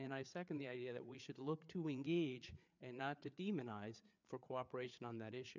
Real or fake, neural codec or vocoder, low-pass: fake; codec, 16 kHz, 4 kbps, FreqCodec, larger model; 7.2 kHz